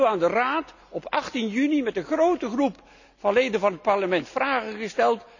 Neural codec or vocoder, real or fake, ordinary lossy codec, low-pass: none; real; none; 7.2 kHz